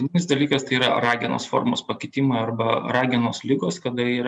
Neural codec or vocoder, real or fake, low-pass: none; real; 10.8 kHz